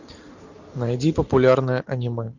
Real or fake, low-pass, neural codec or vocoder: real; 7.2 kHz; none